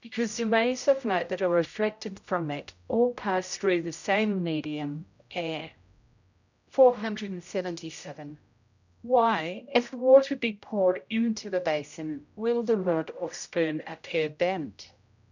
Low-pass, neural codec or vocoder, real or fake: 7.2 kHz; codec, 16 kHz, 0.5 kbps, X-Codec, HuBERT features, trained on general audio; fake